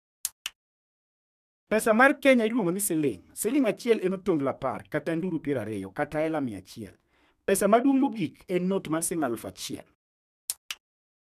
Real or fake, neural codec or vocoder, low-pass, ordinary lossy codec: fake; codec, 32 kHz, 1.9 kbps, SNAC; 14.4 kHz; none